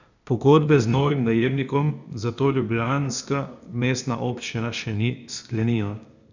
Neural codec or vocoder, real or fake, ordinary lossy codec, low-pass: codec, 16 kHz, 0.8 kbps, ZipCodec; fake; none; 7.2 kHz